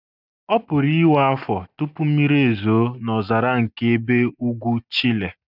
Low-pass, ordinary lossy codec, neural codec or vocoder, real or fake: 5.4 kHz; none; none; real